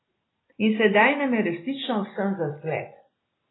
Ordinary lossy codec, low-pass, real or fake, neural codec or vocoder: AAC, 16 kbps; 7.2 kHz; real; none